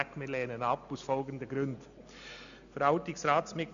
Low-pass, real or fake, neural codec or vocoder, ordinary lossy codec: 7.2 kHz; real; none; none